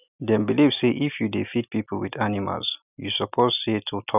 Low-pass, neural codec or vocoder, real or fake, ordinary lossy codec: 3.6 kHz; none; real; none